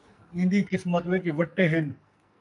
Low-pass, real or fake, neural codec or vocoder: 10.8 kHz; fake; codec, 44.1 kHz, 2.6 kbps, SNAC